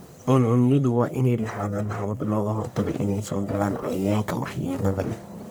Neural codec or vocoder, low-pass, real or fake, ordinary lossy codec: codec, 44.1 kHz, 1.7 kbps, Pupu-Codec; none; fake; none